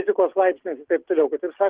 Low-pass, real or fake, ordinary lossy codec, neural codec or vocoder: 3.6 kHz; real; Opus, 16 kbps; none